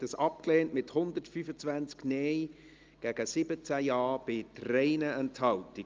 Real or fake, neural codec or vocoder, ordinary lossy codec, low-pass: real; none; Opus, 24 kbps; 7.2 kHz